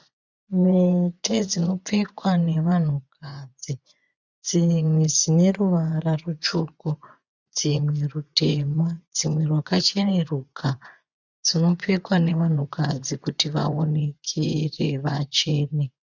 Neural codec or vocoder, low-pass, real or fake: vocoder, 24 kHz, 100 mel bands, Vocos; 7.2 kHz; fake